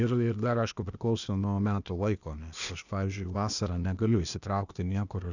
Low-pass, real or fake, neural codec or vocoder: 7.2 kHz; fake; codec, 16 kHz, 0.8 kbps, ZipCodec